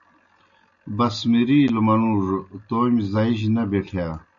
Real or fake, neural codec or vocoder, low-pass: real; none; 7.2 kHz